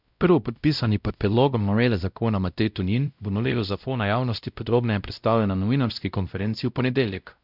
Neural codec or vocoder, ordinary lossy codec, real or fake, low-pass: codec, 16 kHz, 0.5 kbps, X-Codec, WavLM features, trained on Multilingual LibriSpeech; none; fake; 5.4 kHz